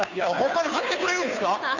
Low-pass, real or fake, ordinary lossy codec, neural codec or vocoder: 7.2 kHz; fake; none; codec, 16 kHz, 2 kbps, FunCodec, trained on Chinese and English, 25 frames a second